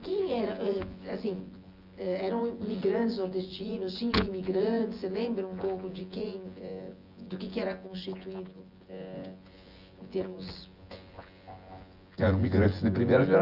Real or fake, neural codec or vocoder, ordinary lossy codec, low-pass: fake; vocoder, 24 kHz, 100 mel bands, Vocos; Opus, 32 kbps; 5.4 kHz